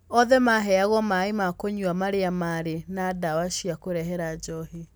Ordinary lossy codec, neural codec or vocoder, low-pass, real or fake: none; none; none; real